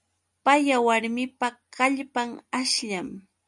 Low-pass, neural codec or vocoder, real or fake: 10.8 kHz; none; real